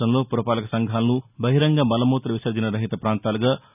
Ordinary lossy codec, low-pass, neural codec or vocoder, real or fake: none; 3.6 kHz; none; real